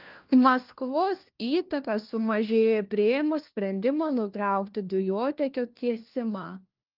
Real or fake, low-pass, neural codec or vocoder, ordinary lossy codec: fake; 5.4 kHz; codec, 16 kHz, 1 kbps, FunCodec, trained on LibriTTS, 50 frames a second; Opus, 32 kbps